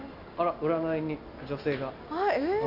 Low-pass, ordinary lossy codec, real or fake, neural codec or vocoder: 5.4 kHz; AAC, 48 kbps; real; none